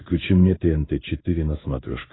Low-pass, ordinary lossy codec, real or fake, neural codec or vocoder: 7.2 kHz; AAC, 16 kbps; fake; codec, 16 kHz in and 24 kHz out, 1 kbps, XY-Tokenizer